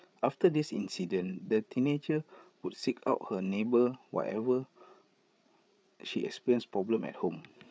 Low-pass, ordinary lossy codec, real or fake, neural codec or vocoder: none; none; fake; codec, 16 kHz, 8 kbps, FreqCodec, larger model